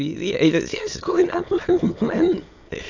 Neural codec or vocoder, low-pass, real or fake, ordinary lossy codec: autoencoder, 22.05 kHz, a latent of 192 numbers a frame, VITS, trained on many speakers; 7.2 kHz; fake; AAC, 48 kbps